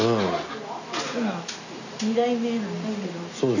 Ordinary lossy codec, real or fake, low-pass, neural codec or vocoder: none; real; 7.2 kHz; none